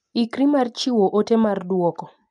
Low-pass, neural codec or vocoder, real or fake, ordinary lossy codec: 10.8 kHz; none; real; MP3, 96 kbps